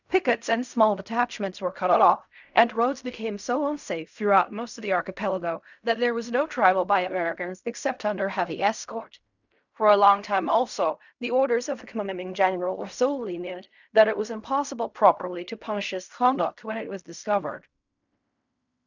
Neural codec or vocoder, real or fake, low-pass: codec, 16 kHz in and 24 kHz out, 0.4 kbps, LongCat-Audio-Codec, fine tuned four codebook decoder; fake; 7.2 kHz